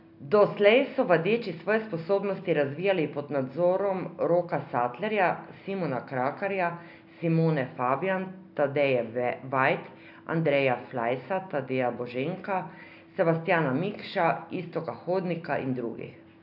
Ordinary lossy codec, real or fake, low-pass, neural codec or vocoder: none; real; 5.4 kHz; none